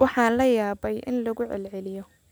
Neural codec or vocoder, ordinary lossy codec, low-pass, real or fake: none; none; none; real